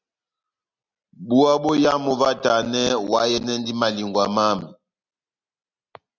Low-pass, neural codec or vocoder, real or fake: 7.2 kHz; none; real